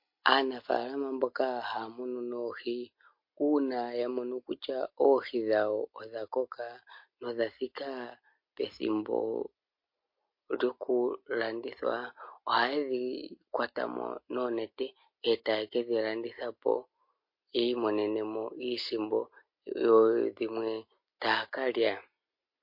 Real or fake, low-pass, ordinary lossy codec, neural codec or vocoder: real; 5.4 kHz; MP3, 32 kbps; none